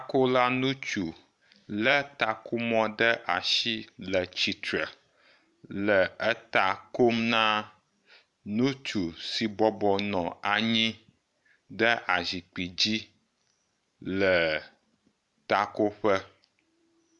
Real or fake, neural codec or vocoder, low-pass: fake; vocoder, 44.1 kHz, 128 mel bands every 512 samples, BigVGAN v2; 10.8 kHz